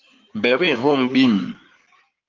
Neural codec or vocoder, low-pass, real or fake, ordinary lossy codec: codec, 16 kHz in and 24 kHz out, 2.2 kbps, FireRedTTS-2 codec; 7.2 kHz; fake; Opus, 32 kbps